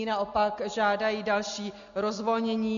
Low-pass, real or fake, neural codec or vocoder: 7.2 kHz; real; none